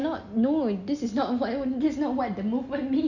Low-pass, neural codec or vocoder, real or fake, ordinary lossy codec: 7.2 kHz; none; real; none